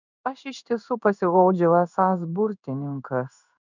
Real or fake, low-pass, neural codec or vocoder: fake; 7.2 kHz; codec, 16 kHz in and 24 kHz out, 1 kbps, XY-Tokenizer